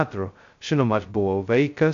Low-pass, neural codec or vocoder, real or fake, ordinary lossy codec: 7.2 kHz; codec, 16 kHz, 0.2 kbps, FocalCodec; fake; MP3, 48 kbps